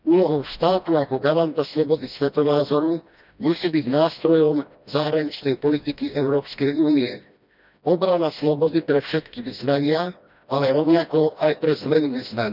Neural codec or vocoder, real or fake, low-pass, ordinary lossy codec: codec, 16 kHz, 1 kbps, FreqCodec, smaller model; fake; 5.4 kHz; none